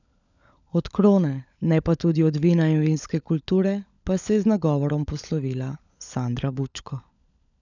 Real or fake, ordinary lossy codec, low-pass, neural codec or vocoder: fake; none; 7.2 kHz; codec, 16 kHz, 16 kbps, FunCodec, trained on LibriTTS, 50 frames a second